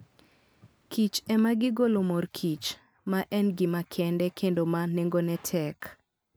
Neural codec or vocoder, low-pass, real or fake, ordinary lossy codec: none; none; real; none